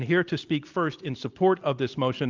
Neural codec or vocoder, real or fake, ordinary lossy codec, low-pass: none; real; Opus, 24 kbps; 7.2 kHz